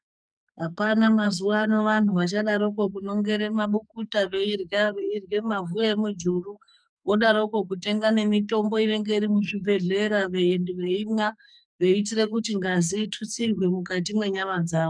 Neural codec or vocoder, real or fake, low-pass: codec, 44.1 kHz, 2.6 kbps, SNAC; fake; 9.9 kHz